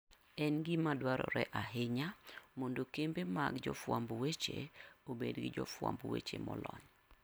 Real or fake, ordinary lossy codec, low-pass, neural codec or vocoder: real; none; none; none